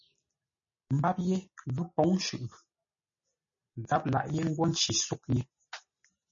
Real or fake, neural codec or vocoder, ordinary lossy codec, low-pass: real; none; MP3, 32 kbps; 7.2 kHz